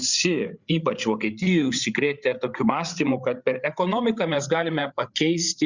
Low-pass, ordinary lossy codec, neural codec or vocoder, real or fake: 7.2 kHz; Opus, 64 kbps; codec, 16 kHz, 8 kbps, FreqCodec, larger model; fake